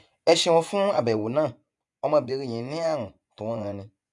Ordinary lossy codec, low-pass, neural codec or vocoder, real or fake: none; 10.8 kHz; vocoder, 24 kHz, 100 mel bands, Vocos; fake